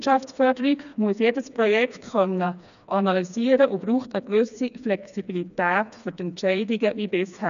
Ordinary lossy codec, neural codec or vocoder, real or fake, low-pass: none; codec, 16 kHz, 2 kbps, FreqCodec, smaller model; fake; 7.2 kHz